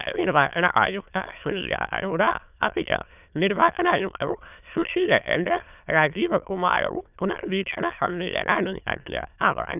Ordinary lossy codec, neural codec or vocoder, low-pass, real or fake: none; autoencoder, 22.05 kHz, a latent of 192 numbers a frame, VITS, trained on many speakers; 3.6 kHz; fake